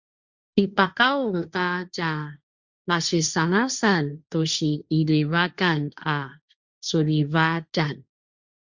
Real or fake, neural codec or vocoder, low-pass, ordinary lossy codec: fake; codec, 16 kHz, 1.1 kbps, Voila-Tokenizer; 7.2 kHz; Opus, 64 kbps